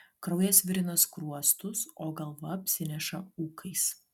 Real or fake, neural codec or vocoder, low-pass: real; none; 19.8 kHz